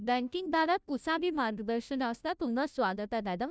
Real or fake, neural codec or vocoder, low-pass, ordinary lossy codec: fake; codec, 16 kHz, 0.5 kbps, FunCodec, trained on Chinese and English, 25 frames a second; none; none